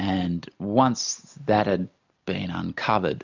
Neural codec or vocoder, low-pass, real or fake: none; 7.2 kHz; real